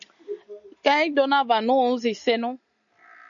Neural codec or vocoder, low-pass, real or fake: none; 7.2 kHz; real